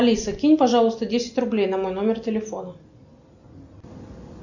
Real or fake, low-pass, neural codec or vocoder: real; 7.2 kHz; none